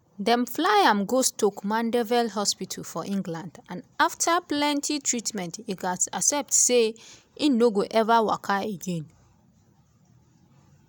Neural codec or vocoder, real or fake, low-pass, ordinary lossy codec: none; real; none; none